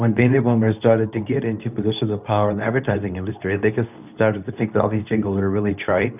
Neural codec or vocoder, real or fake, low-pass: codec, 24 kHz, 0.9 kbps, WavTokenizer, medium speech release version 2; fake; 3.6 kHz